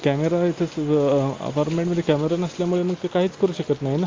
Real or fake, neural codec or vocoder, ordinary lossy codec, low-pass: real; none; Opus, 32 kbps; 7.2 kHz